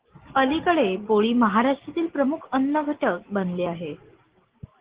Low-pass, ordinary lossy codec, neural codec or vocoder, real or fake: 3.6 kHz; Opus, 16 kbps; none; real